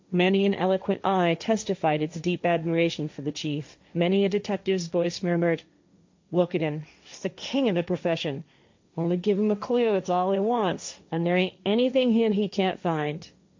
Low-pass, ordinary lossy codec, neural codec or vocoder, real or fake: 7.2 kHz; MP3, 64 kbps; codec, 16 kHz, 1.1 kbps, Voila-Tokenizer; fake